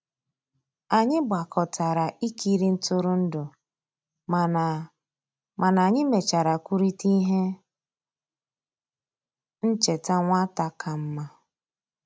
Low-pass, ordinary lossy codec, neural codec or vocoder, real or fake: none; none; none; real